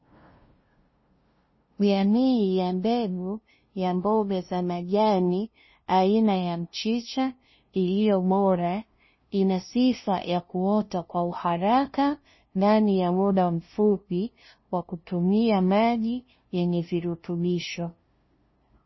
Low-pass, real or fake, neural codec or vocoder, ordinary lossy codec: 7.2 kHz; fake; codec, 16 kHz, 0.5 kbps, FunCodec, trained on LibriTTS, 25 frames a second; MP3, 24 kbps